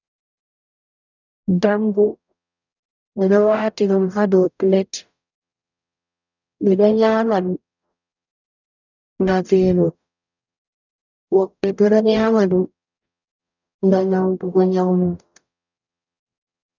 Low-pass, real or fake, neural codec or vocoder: 7.2 kHz; fake; codec, 44.1 kHz, 0.9 kbps, DAC